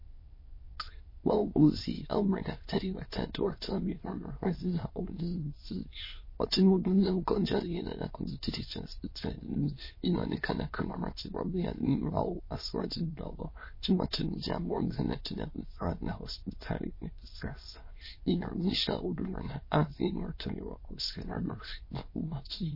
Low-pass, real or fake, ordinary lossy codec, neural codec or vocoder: 5.4 kHz; fake; MP3, 24 kbps; autoencoder, 22.05 kHz, a latent of 192 numbers a frame, VITS, trained on many speakers